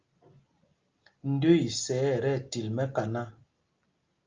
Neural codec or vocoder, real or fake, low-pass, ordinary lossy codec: none; real; 7.2 kHz; Opus, 24 kbps